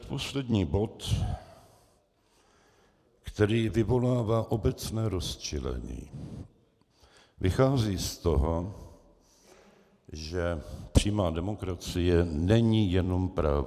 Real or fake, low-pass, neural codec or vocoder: fake; 14.4 kHz; vocoder, 44.1 kHz, 128 mel bands every 256 samples, BigVGAN v2